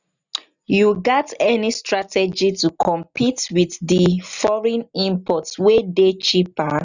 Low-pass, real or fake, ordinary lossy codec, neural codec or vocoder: 7.2 kHz; real; none; none